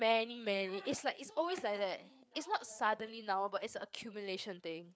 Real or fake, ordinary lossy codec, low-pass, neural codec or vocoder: fake; none; none; codec, 16 kHz, 4 kbps, FreqCodec, larger model